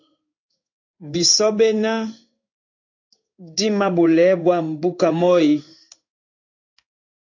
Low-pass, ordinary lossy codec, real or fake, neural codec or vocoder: 7.2 kHz; AAC, 48 kbps; fake; codec, 16 kHz in and 24 kHz out, 1 kbps, XY-Tokenizer